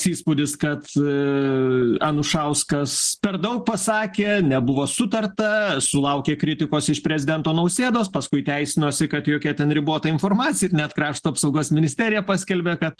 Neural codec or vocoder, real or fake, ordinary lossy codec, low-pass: none; real; Opus, 16 kbps; 10.8 kHz